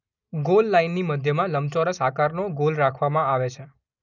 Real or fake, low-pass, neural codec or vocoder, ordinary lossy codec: real; 7.2 kHz; none; none